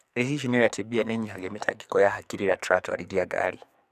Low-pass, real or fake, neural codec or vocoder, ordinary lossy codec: 14.4 kHz; fake; codec, 44.1 kHz, 2.6 kbps, SNAC; none